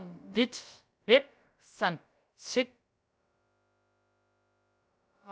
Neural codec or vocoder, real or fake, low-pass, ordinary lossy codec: codec, 16 kHz, about 1 kbps, DyCAST, with the encoder's durations; fake; none; none